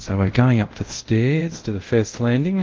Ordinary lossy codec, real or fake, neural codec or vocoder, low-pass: Opus, 16 kbps; fake; codec, 24 kHz, 0.5 kbps, DualCodec; 7.2 kHz